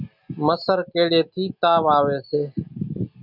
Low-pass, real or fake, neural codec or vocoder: 5.4 kHz; real; none